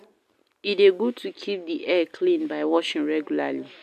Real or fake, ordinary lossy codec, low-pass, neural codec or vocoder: real; none; 14.4 kHz; none